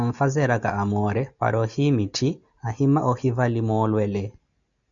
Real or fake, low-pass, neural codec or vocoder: real; 7.2 kHz; none